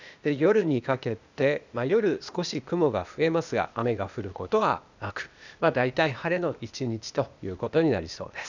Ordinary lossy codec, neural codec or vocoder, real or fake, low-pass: none; codec, 16 kHz, 0.8 kbps, ZipCodec; fake; 7.2 kHz